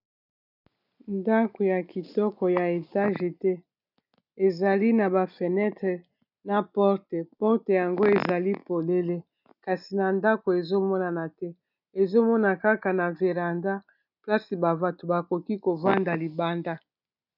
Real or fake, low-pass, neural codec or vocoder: real; 5.4 kHz; none